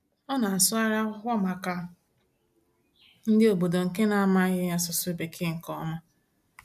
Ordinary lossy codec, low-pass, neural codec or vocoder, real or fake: AAC, 96 kbps; 14.4 kHz; none; real